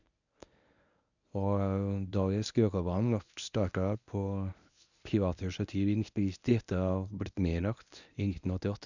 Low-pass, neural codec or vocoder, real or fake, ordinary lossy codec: 7.2 kHz; codec, 24 kHz, 0.9 kbps, WavTokenizer, medium speech release version 1; fake; none